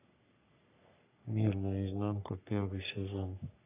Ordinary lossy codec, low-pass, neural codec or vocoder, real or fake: none; 3.6 kHz; codec, 44.1 kHz, 3.4 kbps, Pupu-Codec; fake